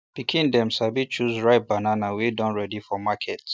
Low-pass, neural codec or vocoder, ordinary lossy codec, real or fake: none; none; none; real